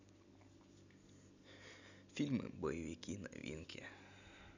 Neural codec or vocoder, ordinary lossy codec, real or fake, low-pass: none; none; real; 7.2 kHz